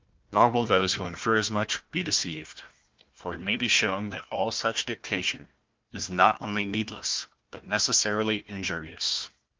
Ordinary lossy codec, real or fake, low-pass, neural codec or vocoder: Opus, 32 kbps; fake; 7.2 kHz; codec, 16 kHz, 1 kbps, FunCodec, trained on Chinese and English, 50 frames a second